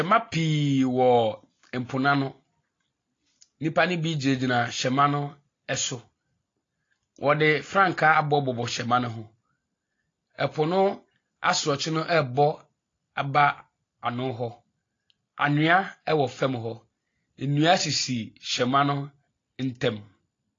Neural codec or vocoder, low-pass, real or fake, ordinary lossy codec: none; 7.2 kHz; real; AAC, 32 kbps